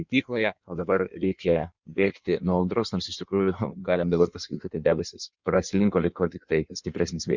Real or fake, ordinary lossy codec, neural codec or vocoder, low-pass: fake; Opus, 64 kbps; codec, 16 kHz in and 24 kHz out, 1.1 kbps, FireRedTTS-2 codec; 7.2 kHz